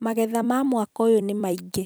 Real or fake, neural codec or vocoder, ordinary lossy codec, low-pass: fake; vocoder, 44.1 kHz, 128 mel bands every 256 samples, BigVGAN v2; none; none